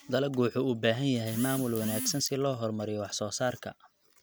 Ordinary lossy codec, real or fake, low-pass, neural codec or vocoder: none; real; none; none